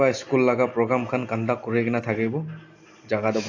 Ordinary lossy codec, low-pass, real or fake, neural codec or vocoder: AAC, 48 kbps; 7.2 kHz; real; none